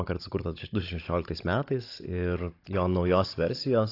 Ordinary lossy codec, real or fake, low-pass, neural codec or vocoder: AAC, 32 kbps; fake; 5.4 kHz; codec, 16 kHz, 16 kbps, FunCodec, trained on Chinese and English, 50 frames a second